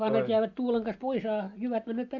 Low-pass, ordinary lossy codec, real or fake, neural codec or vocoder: 7.2 kHz; AAC, 48 kbps; real; none